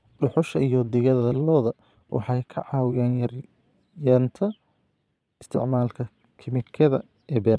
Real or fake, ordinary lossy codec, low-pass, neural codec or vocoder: fake; none; none; vocoder, 22.05 kHz, 80 mel bands, Vocos